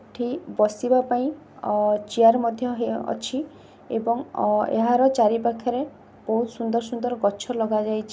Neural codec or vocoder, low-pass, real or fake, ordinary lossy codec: none; none; real; none